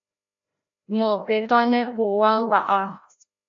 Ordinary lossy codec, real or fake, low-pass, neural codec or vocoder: AAC, 48 kbps; fake; 7.2 kHz; codec, 16 kHz, 0.5 kbps, FreqCodec, larger model